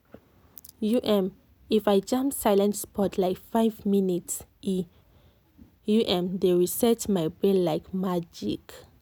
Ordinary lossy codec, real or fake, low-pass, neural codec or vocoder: none; real; none; none